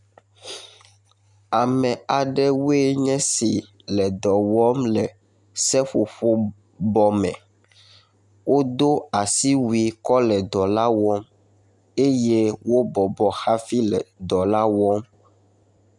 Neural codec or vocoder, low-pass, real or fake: none; 10.8 kHz; real